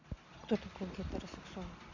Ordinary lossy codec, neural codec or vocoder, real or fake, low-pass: none; none; real; 7.2 kHz